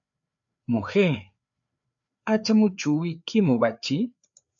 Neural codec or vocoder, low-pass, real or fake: codec, 16 kHz, 4 kbps, FreqCodec, larger model; 7.2 kHz; fake